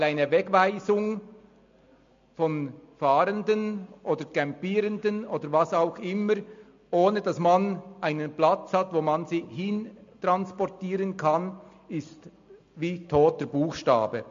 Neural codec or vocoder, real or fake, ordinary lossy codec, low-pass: none; real; MP3, 48 kbps; 7.2 kHz